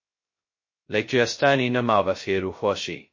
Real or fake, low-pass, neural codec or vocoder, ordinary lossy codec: fake; 7.2 kHz; codec, 16 kHz, 0.2 kbps, FocalCodec; MP3, 32 kbps